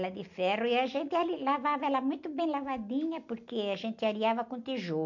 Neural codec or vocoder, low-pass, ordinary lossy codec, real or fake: none; 7.2 kHz; none; real